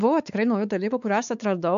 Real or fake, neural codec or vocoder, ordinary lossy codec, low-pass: fake; codec, 16 kHz, 4 kbps, X-Codec, WavLM features, trained on Multilingual LibriSpeech; AAC, 96 kbps; 7.2 kHz